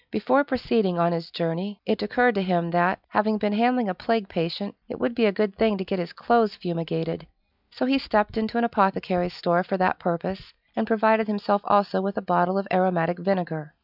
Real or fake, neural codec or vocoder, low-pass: real; none; 5.4 kHz